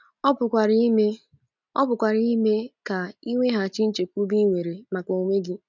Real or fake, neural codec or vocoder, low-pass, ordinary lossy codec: real; none; 7.2 kHz; none